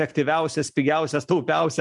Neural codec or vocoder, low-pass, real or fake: none; 10.8 kHz; real